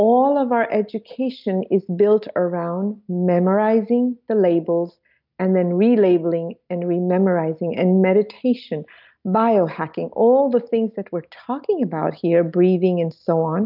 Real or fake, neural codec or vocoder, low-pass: real; none; 5.4 kHz